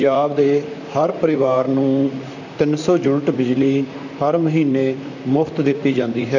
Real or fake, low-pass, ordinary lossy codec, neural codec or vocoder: fake; 7.2 kHz; none; vocoder, 44.1 kHz, 128 mel bands, Pupu-Vocoder